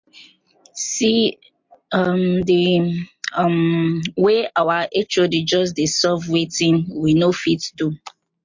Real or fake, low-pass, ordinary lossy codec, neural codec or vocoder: real; 7.2 kHz; MP3, 48 kbps; none